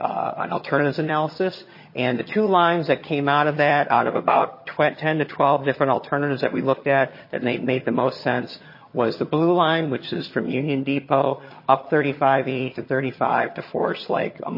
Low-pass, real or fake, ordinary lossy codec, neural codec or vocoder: 5.4 kHz; fake; MP3, 24 kbps; vocoder, 22.05 kHz, 80 mel bands, HiFi-GAN